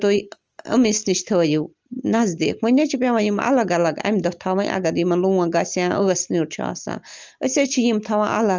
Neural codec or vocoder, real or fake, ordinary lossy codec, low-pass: none; real; Opus, 24 kbps; 7.2 kHz